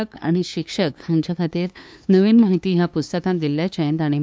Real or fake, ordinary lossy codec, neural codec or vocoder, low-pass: fake; none; codec, 16 kHz, 2 kbps, FunCodec, trained on LibriTTS, 25 frames a second; none